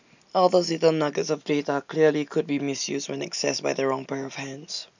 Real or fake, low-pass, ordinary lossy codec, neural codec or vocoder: real; 7.2 kHz; none; none